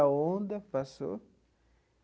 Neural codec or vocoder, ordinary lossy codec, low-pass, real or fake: none; none; none; real